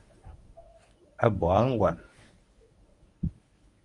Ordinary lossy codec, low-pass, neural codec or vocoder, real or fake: MP3, 96 kbps; 10.8 kHz; codec, 24 kHz, 0.9 kbps, WavTokenizer, medium speech release version 1; fake